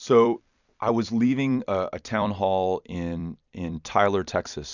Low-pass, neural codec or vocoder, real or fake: 7.2 kHz; vocoder, 44.1 kHz, 128 mel bands every 256 samples, BigVGAN v2; fake